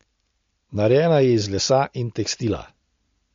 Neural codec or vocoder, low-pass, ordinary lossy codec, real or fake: none; 7.2 kHz; MP3, 48 kbps; real